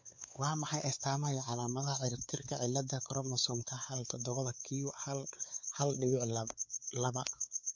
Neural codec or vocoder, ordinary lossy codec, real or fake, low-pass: codec, 16 kHz, 4 kbps, X-Codec, WavLM features, trained on Multilingual LibriSpeech; MP3, 48 kbps; fake; 7.2 kHz